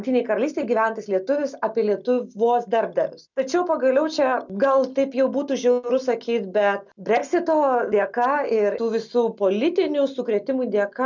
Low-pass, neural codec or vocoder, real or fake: 7.2 kHz; none; real